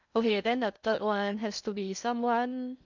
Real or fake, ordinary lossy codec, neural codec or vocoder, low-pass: fake; none; codec, 16 kHz in and 24 kHz out, 0.6 kbps, FocalCodec, streaming, 2048 codes; 7.2 kHz